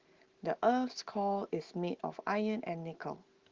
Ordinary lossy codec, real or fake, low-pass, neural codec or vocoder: Opus, 16 kbps; real; 7.2 kHz; none